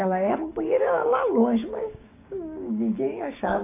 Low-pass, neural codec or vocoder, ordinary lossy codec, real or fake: 3.6 kHz; codec, 16 kHz, 6 kbps, DAC; AAC, 24 kbps; fake